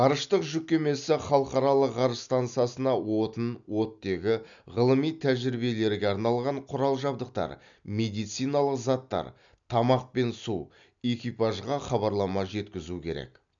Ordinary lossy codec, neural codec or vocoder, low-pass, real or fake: none; none; 7.2 kHz; real